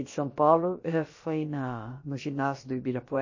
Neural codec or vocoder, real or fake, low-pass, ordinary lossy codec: codec, 16 kHz, about 1 kbps, DyCAST, with the encoder's durations; fake; 7.2 kHz; MP3, 32 kbps